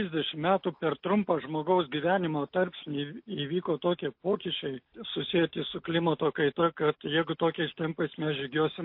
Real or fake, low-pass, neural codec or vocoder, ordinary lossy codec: real; 5.4 kHz; none; MP3, 32 kbps